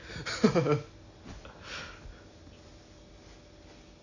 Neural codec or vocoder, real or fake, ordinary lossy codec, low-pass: none; real; none; 7.2 kHz